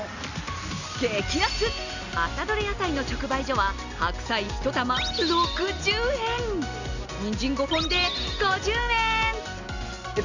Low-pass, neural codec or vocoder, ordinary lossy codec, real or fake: 7.2 kHz; none; none; real